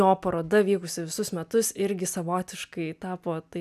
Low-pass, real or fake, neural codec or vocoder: 14.4 kHz; real; none